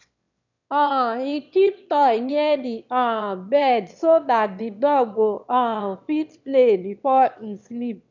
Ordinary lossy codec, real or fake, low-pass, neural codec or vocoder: none; fake; 7.2 kHz; autoencoder, 22.05 kHz, a latent of 192 numbers a frame, VITS, trained on one speaker